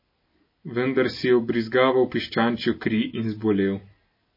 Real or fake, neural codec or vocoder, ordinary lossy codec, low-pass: real; none; MP3, 24 kbps; 5.4 kHz